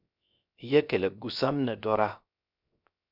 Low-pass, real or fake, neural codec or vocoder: 5.4 kHz; fake; codec, 16 kHz, 0.3 kbps, FocalCodec